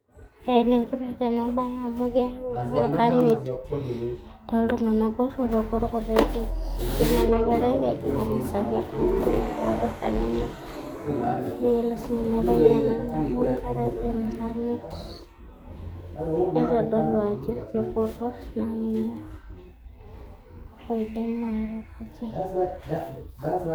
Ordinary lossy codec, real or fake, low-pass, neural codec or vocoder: none; fake; none; codec, 44.1 kHz, 2.6 kbps, SNAC